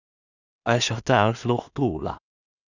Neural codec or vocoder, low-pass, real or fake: codec, 16 kHz in and 24 kHz out, 0.4 kbps, LongCat-Audio-Codec, two codebook decoder; 7.2 kHz; fake